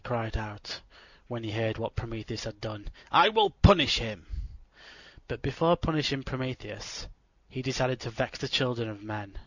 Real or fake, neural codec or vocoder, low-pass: real; none; 7.2 kHz